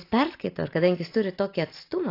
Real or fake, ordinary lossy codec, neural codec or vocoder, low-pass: real; AAC, 32 kbps; none; 5.4 kHz